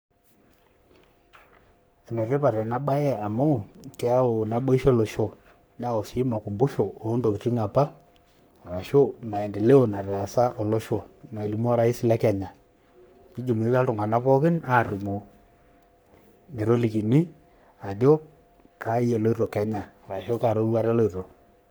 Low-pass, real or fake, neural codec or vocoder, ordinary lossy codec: none; fake; codec, 44.1 kHz, 3.4 kbps, Pupu-Codec; none